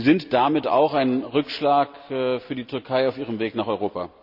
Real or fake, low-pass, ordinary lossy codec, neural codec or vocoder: real; 5.4 kHz; none; none